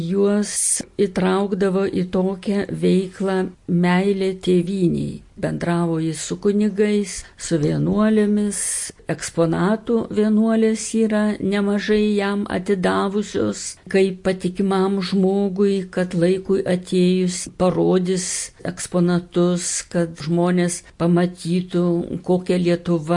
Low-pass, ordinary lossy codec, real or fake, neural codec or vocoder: 10.8 kHz; MP3, 48 kbps; real; none